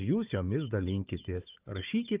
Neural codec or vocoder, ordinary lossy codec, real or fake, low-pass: codec, 16 kHz, 4 kbps, FunCodec, trained on Chinese and English, 50 frames a second; Opus, 24 kbps; fake; 3.6 kHz